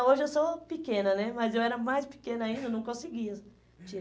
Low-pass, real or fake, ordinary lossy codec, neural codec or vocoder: none; real; none; none